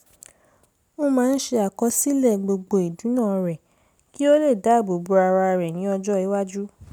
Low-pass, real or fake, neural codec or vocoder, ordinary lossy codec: none; real; none; none